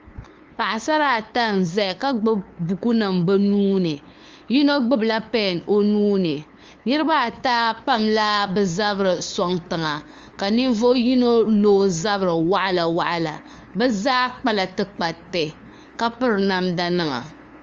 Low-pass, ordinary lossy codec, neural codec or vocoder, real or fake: 7.2 kHz; Opus, 32 kbps; codec, 16 kHz, 2 kbps, FunCodec, trained on LibriTTS, 25 frames a second; fake